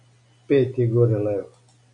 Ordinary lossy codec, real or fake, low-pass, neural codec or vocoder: AAC, 48 kbps; real; 9.9 kHz; none